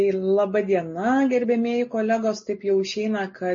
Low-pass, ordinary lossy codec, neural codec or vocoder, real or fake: 7.2 kHz; MP3, 32 kbps; none; real